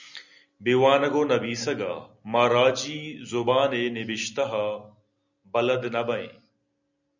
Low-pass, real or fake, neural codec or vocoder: 7.2 kHz; real; none